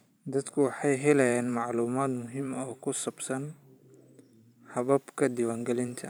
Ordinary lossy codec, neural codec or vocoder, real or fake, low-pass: none; vocoder, 44.1 kHz, 128 mel bands every 512 samples, BigVGAN v2; fake; none